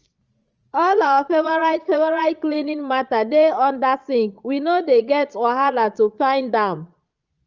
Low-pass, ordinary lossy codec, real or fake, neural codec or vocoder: 7.2 kHz; Opus, 24 kbps; fake; vocoder, 22.05 kHz, 80 mel bands, Vocos